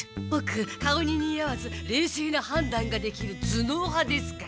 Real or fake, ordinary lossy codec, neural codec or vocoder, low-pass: real; none; none; none